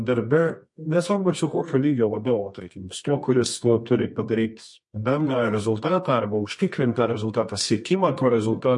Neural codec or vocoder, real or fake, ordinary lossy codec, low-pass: codec, 24 kHz, 0.9 kbps, WavTokenizer, medium music audio release; fake; MP3, 48 kbps; 10.8 kHz